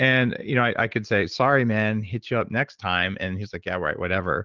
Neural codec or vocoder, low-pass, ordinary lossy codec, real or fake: none; 7.2 kHz; Opus, 16 kbps; real